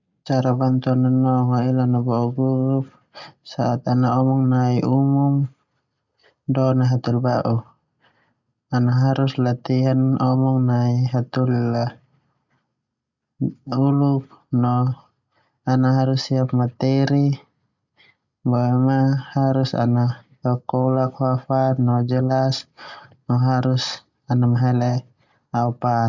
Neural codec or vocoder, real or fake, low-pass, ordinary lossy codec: none; real; 7.2 kHz; none